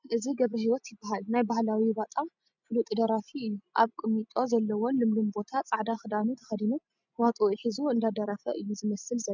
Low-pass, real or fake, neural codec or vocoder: 7.2 kHz; real; none